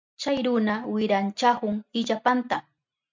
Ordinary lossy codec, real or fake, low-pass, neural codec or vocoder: MP3, 64 kbps; real; 7.2 kHz; none